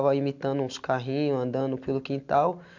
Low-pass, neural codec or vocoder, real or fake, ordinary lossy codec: 7.2 kHz; none; real; MP3, 64 kbps